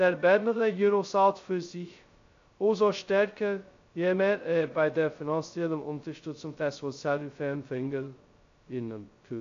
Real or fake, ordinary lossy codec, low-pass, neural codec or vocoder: fake; AAC, 48 kbps; 7.2 kHz; codec, 16 kHz, 0.2 kbps, FocalCodec